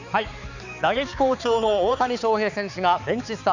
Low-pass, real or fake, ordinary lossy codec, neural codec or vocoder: 7.2 kHz; fake; none; codec, 16 kHz, 4 kbps, X-Codec, HuBERT features, trained on balanced general audio